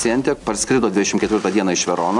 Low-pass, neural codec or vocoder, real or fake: 10.8 kHz; none; real